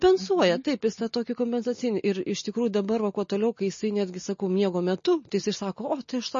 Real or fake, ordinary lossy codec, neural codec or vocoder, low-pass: real; MP3, 32 kbps; none; 7.2 kHz